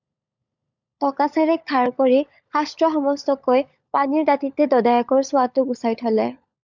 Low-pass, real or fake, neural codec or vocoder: 7.2 kHz; fake; codec, 16 kHz, 16 kbps, FunCodec, trained on LibriTTS, 50 frames a second